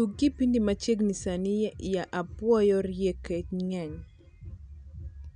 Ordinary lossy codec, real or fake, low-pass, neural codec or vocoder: none; real; 9.9 kHz; none